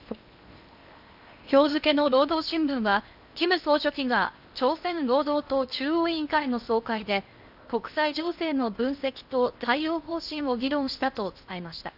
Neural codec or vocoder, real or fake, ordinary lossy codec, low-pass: codec, 16 kHz in and 24 kHz out, 0.8 kbps, FocalCodec, streaming, 65536 codes; fake; AAC, 48 kbps; 5.4 kHz